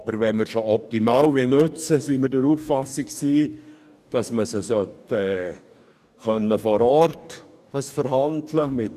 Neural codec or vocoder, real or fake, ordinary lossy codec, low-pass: codec, 44.1 kHz, 2.6 kbps, DAC; fake; none; 14.4 kHz